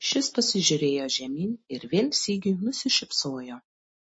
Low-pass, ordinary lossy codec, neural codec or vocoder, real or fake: 9.9 kHz; MP3, 32 kbps; none; real